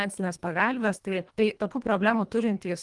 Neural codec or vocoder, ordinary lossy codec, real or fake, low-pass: codec, 24 kHz, 1.5 kbps, HILCodec; Opus, 24 kbps; fake; 10.8 kHz